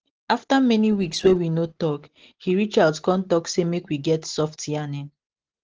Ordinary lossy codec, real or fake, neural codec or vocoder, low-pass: Opus, 16 kbps; real; none; 7.2 kHz